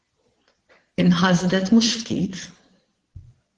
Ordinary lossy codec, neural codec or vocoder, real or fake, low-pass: Opus, 16 kbps; vocoder, 22.05 kHz, 80 mel bands, Vocos; fake; 9.9 kHz